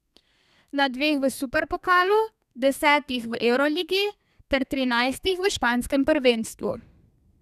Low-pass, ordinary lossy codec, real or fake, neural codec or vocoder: 14.4 kHz; none; fake; codec, 32 kHz, 1.9 kbps, SNAC